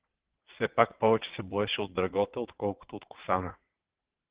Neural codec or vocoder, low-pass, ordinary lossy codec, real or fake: vocoder, 22.05 kHz, 80 mel bands, WaveNeXt; 3.6 kHz; Opus, 16 kbps; fake